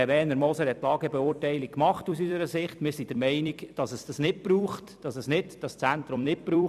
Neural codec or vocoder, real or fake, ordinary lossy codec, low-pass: vocoder, 44.1 kHz, 128 mel bands every 256 samples, BigVGAN v2; fake; none; 14.4 kHz